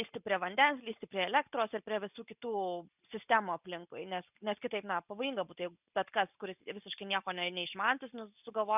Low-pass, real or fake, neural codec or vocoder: 3.6 kHz; real; none